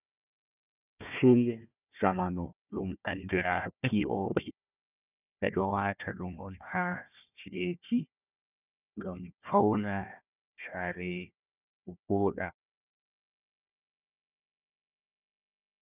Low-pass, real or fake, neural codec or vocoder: 3.6 kHz; fake; codec, 16 kHz, 1 kbps, FunCodec, trained on Chinese and English, 50 frames a second